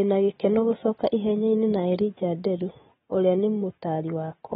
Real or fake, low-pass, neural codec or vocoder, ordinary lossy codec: real; 10.8 kHz; none; AAC, 16 kbps